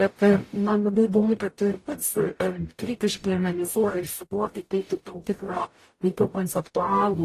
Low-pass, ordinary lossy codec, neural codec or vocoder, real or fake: 14.4 kHz; AAC, 48 kbps; codec, 44.1 kHz, 0.9 kbps, DAC; fake